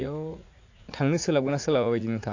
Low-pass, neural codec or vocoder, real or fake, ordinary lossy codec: 7.2 kHz; vocoder, 44.1 kHz, 80 mel bands, Vocos; fake; AAC, 48 kbps